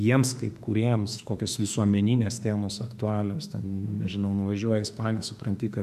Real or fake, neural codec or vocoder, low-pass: fake; autoencoder, 48 kHz, 32 numbers a frame, DAC-VAE, trained on Japanese speech; 14.4 kHz